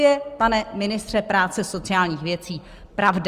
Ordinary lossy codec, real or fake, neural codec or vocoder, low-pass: Opus, 32 kbps; real; none; 14.4 kHz